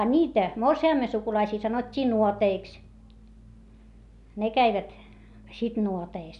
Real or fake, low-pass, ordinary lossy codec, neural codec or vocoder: real; 10.8 kHz; none; none